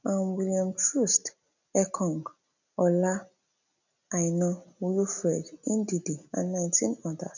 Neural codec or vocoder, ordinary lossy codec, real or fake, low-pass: none; none; real; 7.2 kHz